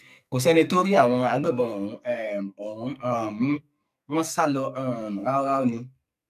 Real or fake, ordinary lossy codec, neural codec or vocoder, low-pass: fake; none; codec, 44.1 kHz, 2.6 kbps, SNAC; 14.4 kHz